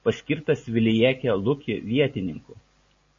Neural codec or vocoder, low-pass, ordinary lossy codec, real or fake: none; 7.2 kHz; MP3, 32 kbps; real